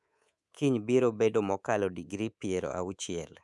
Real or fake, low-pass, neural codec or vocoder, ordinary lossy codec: fake; none; codec, 24 kHz, 3.1 kbps, DualCodec; none